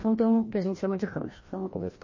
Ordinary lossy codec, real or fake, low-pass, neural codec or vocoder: MP3, 32 kbps; fake; 7.2 kHz; codec, 16 kHz, 1 kbps, FreqCodec, larger model